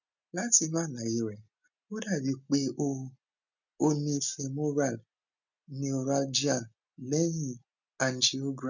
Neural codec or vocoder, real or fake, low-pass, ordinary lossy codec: none; real; 7.2 kHz; none